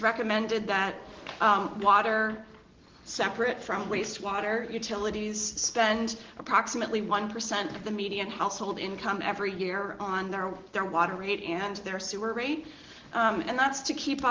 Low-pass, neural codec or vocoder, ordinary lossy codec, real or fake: 7.2 kHz; none; Opus, 16 kbps; real